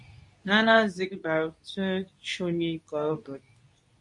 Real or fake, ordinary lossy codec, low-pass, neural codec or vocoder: fake; MP3, 48 kbps; 10.8 kHz; codec, 24 kHz, 0.9 kbps, WavTokenizer, medium speech release version 2